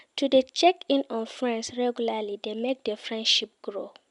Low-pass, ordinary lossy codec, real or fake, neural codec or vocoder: 10.8 kHz; MP3, 96 kbps; real; none